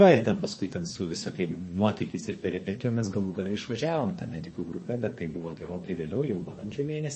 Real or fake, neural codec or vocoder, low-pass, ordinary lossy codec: fake; codec, 24 kHz, 1 kbps, SNAC; 9.9 kHz; MP3, 32 kbps